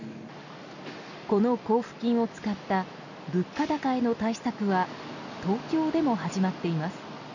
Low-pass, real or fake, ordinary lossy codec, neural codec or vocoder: 7.2 kHz; real; none; none